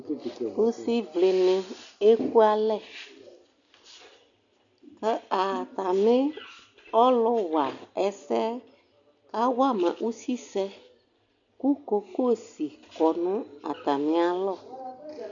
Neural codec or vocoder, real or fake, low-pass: none; real; 7.2 kHz